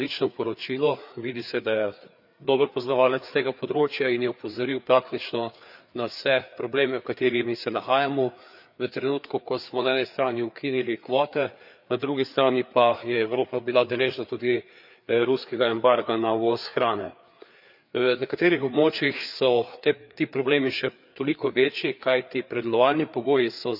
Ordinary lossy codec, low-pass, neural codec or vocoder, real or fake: none; 5.4 kHz; codec, 16 kHz, 4 kbps, FreqCodec, larger model; fake